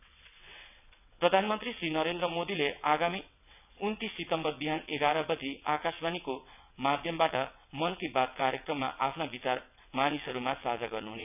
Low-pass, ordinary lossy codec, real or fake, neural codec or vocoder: 3.6 kHz; none; fake; vocoder, 22.05 kHz, 80 mel bands, WaveNeXt